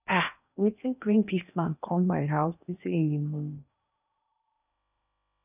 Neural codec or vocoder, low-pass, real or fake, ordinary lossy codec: codec, 16 kHz in and 24 kHz out, 0.8 kbps, FocalCodec, streaming, 65536 codes; 3.6 kHz; fake; none